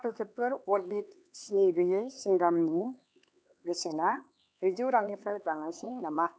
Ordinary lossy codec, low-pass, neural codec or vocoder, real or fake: none; none; codec, 16 kHz, 4 kbps, X-Codec, HuBERT features, trained on LibriSpeech; fake